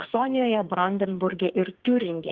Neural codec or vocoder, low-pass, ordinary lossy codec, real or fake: codec, 44.1 kHz, 2.6 kbps, SNAC; 7.2 kHz; Opus, 16 kbps; fake